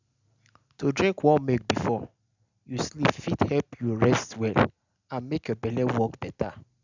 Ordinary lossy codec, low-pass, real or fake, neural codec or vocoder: none; 7.2 kHz; real; none